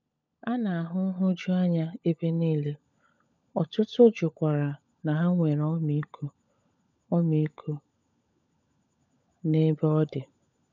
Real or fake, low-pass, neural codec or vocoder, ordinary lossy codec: fake; 7.2 kHz; codec, 16 kHz, 16 kbps, FunCodec, trained on LibriTTS, 50 frames a second; none